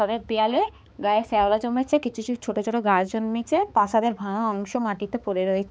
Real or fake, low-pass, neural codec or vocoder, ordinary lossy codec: fake; none; codec, 16 kHz, 4 kbps, X-Codec, HuBERT features, trained on balanced general audio; none